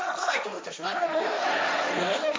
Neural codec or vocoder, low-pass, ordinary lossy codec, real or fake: codec, 16 kHz, 1.1 kbps, Voila-Tokenizer; none; none; fake